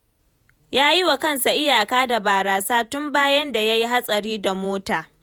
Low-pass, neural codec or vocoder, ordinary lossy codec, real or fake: none; vocoder, 48 kHz, 128 mel bands, Vocos; none; fake